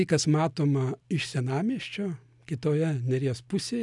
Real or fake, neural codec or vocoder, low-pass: real; none; 10.8 kHz